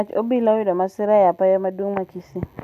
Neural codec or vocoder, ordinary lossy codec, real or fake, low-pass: none; none; real; 14.4 kHz